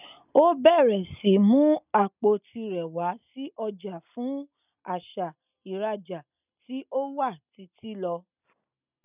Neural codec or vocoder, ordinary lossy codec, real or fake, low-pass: none; none; real; 3.6 kHz